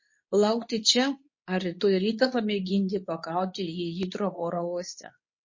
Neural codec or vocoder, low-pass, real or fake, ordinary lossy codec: codec, 24 kHz, 0.9 kbps, WavTokenizer, medium speech release version 2; 7.2 kHz; fake; MP3, 32 kbps